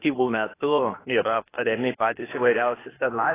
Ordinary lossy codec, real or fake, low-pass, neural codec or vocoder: AAC, 16 kbps; fake; 3.6 kHz; codec, 16 kHz, 0.8 kbps, ZipCodec